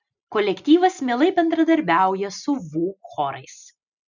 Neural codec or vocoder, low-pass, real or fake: none; 7.2 kHz; real